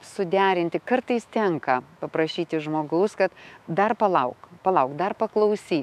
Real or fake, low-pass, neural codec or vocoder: fake; 14.4 kHz; autoencoder, 48 kHz, 128 numbers a frame, DAC-VAE, trained on Japanese speech